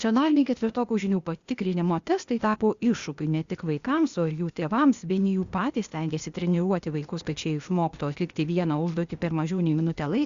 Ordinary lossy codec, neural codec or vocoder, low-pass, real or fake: Opus, 64 kbps; codec, 16 kHz, 0.8 kbps, ZipCodec; 7.2 kHz; fake